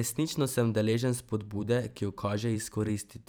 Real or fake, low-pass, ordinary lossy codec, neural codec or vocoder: fake; none; none; vocoder, 44.1 kHz, 128 mel bands every 512 samples, BigVGAN v2